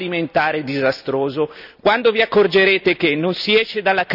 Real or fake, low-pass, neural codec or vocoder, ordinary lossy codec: real; 5.4 kHz; none; none